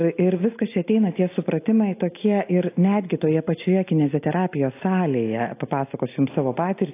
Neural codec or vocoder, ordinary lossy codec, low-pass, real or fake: none; AAC, 24 kbps; 3.6 kHz; real